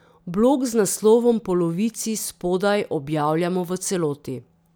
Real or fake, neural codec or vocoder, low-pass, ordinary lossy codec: real; none; none; none